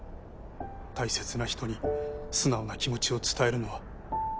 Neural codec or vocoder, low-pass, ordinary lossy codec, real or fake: none; none; none; real